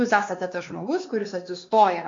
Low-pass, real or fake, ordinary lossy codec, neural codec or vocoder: 7.2 kHz; fake; AAC, 48 kbps; codec, 16 kHz, 2 kbps, X-Codec, WavLM features, trained on Multilingual LibriSpeech